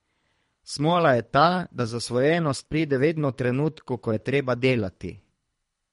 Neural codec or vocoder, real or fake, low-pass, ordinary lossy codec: codec, 24 kHz, 3 kbps, HILCodec; fake; 10.8 kHz; MP3, 48 kbps